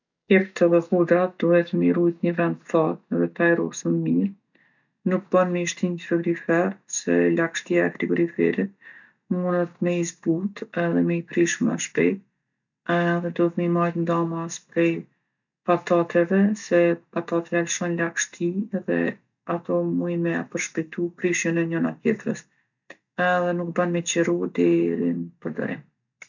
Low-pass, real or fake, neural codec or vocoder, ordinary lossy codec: 7.2 kHz; real; none; none